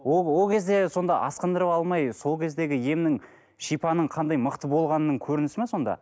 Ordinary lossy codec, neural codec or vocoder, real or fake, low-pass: none; none; real; none